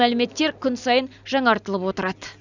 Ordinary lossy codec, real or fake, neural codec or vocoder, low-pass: none; real; none; 7.2 kHz